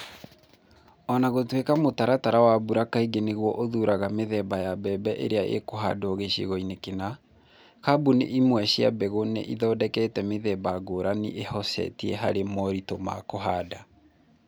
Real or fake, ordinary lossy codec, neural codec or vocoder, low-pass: real; none; none; none